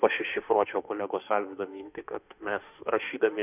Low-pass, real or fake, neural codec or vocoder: 3.6 kHz; fake; autoencoder, 48 kHz, 32 numbers a frame, DAC-VAE, trained on Japanese speech